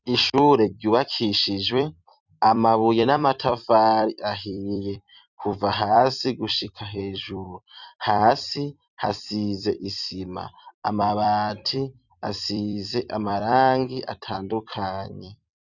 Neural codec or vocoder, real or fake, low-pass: vocoder, 44.1 kHz, 128 mel bands every 256 samples, BigVGAN v2; fake; 7.2 kHz